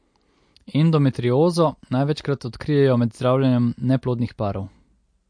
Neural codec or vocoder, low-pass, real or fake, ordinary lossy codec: none; 9.9 kHz; real; MP3, 48 kbps